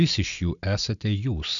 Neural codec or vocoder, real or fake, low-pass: none; real; 7.2 kHz